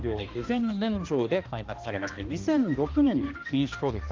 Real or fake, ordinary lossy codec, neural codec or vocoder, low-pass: fake; Opus, 32 kbps; codec, 16 kHz, 1 kbps, X-Codec, HuBERT features, trained on balanced general audio; 7.2 kHz